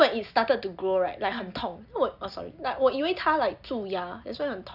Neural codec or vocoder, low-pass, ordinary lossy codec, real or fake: none; 5.4 kHz; none; real